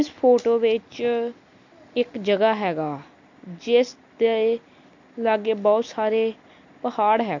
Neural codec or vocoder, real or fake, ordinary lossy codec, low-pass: none; real; MP3, 48 kbps; 7.2 kHz